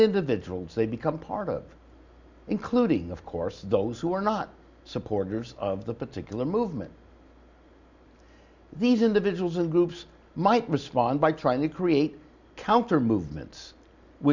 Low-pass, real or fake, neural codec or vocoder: 7.2 kHz; real; none